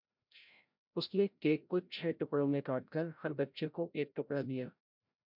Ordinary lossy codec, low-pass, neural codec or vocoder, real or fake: MP3, 48 kbps; 5.4 kHz; codec, 16 kHz, 0.5 kbps, FreqCodec, larger model; fake